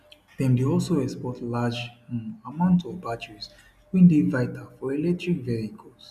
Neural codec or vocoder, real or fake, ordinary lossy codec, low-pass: none; real; none; 14.4 kHz